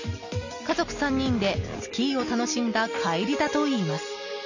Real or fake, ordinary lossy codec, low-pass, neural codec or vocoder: real; none; 7.2 kHz; none